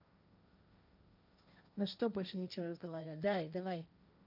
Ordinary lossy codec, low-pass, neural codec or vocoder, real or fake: none; 5.4 kHz; codec, 16 kHz, 1.1 kbps, Voila-Tokenizer; fake